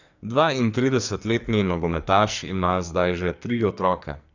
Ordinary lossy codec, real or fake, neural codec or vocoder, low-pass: none; fake; codec, 44.1 kHz, 2.6 kbps, SNAC; 7.2 kHz